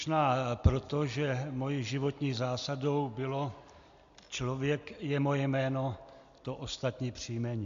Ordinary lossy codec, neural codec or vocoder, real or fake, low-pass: AAC, 64 kbps; none; real; 7.2 kHz